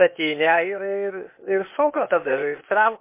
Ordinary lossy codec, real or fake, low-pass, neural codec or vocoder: MP3, 24 kbps; fake; 3.6 kHz; codec, 16 kHz, 0.8 kbps, ZipCodec